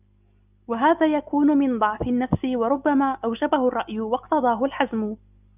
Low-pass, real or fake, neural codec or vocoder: 3.6 kHz; real; none